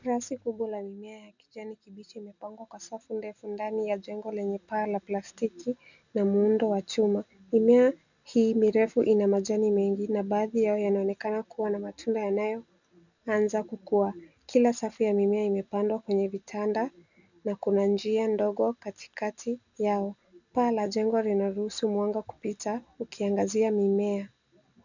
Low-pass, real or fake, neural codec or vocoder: 7.2 kHz; real; none